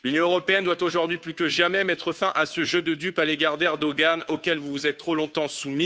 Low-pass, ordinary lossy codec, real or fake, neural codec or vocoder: none; none; fake; codec, 16 kHz, 2 kbps, FunCodec, trained on Chinese and English, 25 frames a second